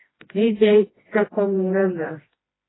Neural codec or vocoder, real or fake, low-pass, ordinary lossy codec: codec, 16 kHz, 1 kbps, FreqCodec, smaller model; fake; 7.2 kHz; AAC, 16 kbps